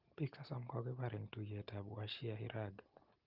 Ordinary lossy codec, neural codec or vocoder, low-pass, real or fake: Opus, 32 kbps; none; 5.4 kHz; real